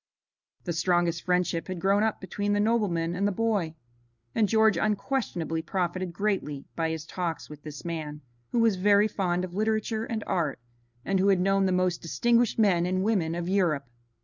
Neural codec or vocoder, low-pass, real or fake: none; 7.2 kHz; real